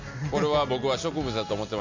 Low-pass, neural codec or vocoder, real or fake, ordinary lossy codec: 7.2 kHz; none; real; MP3, 64 kbps